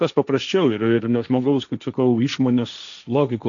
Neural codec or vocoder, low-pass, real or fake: codec, 16 kHz, 1.1 kbps, Voila-Tokenizer; 7.2 kHz; fake